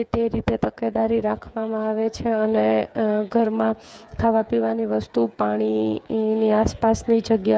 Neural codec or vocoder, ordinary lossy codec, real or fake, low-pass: codec, 16 kHz, 8 kbps, FreqCodec, smaller model; none; fake; none